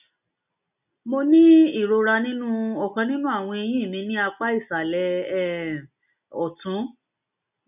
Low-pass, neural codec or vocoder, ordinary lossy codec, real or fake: 3.6 kHz; none; none; real